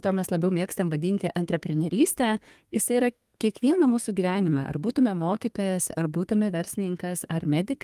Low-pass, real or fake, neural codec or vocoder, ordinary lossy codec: 14.4 kHz; fake; codec, 32 kHz, 1.9 kbps, SNAC; Opus, 32 kbps